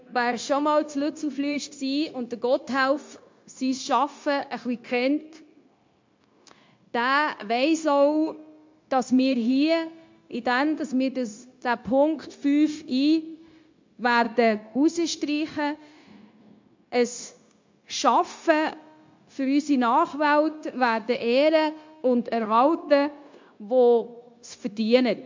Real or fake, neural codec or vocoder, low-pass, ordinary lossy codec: fake; codec, 16 kHz, 0.9 kbps, LongCat-Audio-Codec; 7.2 kHz; MP3, 48 kbps